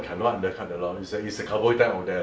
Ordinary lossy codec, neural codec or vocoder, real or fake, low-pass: none; none; real; none